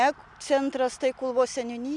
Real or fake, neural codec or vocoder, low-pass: real; none; 10.8 kHz